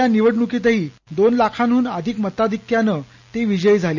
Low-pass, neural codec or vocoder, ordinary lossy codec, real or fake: 7.2 kHz; none; none; real